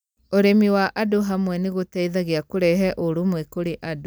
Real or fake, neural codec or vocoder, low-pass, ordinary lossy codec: real; none; none; none